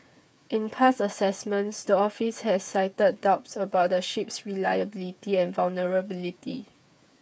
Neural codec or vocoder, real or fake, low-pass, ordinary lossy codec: codec, 16 kHz, 8 kbps, FreqCodec, smaller model; fake; none; none